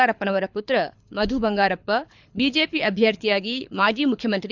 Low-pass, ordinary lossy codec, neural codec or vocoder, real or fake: 7.2 kHz; none; codec, 24 kHz, 6 kbps, HILCodec; fake